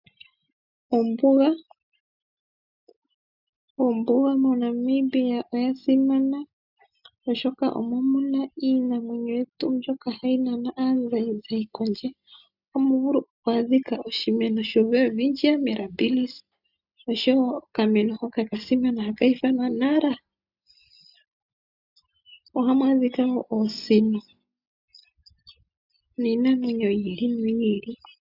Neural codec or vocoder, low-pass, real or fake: none; 5.4 kHz; real